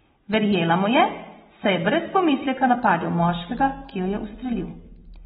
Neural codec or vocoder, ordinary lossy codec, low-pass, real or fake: none; AAC, 16 kbps; 10.8 kHz; real